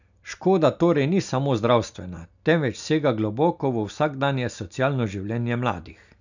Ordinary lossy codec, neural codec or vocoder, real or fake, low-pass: none; none; real; 7.2 kHz